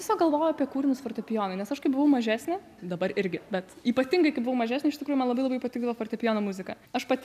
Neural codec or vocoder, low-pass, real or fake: none; 14.4 kHz; real